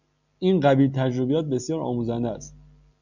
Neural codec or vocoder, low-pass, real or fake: none; 7.2 kHz; real